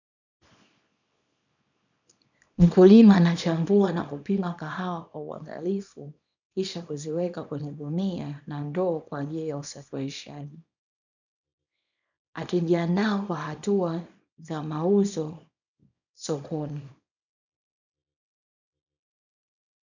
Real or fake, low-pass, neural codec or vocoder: fake; 7.2 kHz; codec, 24 kHz, 0.9 kbps, WavTokenizer, small release